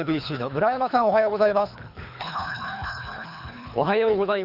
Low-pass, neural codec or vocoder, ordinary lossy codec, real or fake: 5.4 kHz; codec, 24 kHz, 3 kbps, HILCodec; none; fake